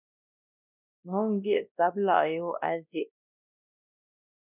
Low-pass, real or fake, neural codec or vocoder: 3.6 kHz; fake; codec, 16 kHz, 1 kbps, X-Codec, WavLM features, trained on Multilingual LibriSpeech